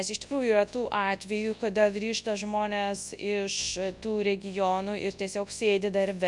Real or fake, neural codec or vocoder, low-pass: fake; codec, 24 kHz, 0.9 kbps, WavTokenizer, large speech release; 10.8 kHz